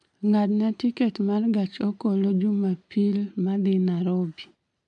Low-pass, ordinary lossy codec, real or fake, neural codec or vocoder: 10.8 kHz; MP3, 64 kbps; real; none